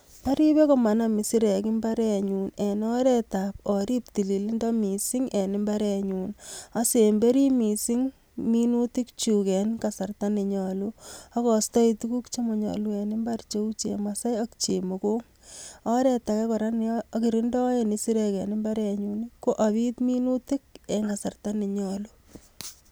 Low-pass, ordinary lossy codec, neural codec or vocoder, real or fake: none; none; none; real